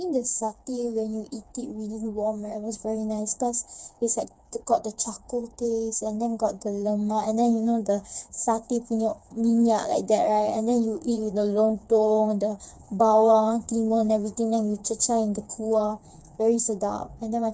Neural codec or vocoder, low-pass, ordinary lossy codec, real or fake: codec, 16 kHz, 4 kbps, FreqCodec, smaller model; none; none; fake